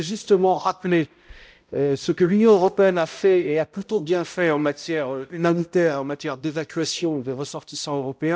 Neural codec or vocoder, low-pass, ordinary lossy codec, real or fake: codec, 16 kHz, 0.5 kbps, X-Codec, HuBERT features, trained on balanced general audio; none; none; fake